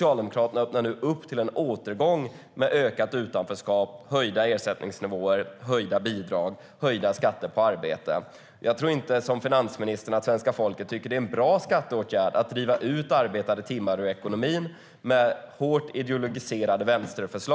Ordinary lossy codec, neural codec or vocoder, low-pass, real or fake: none; none; none; real